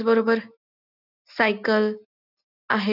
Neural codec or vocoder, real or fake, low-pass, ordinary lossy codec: none; real; 5.4 kHz; none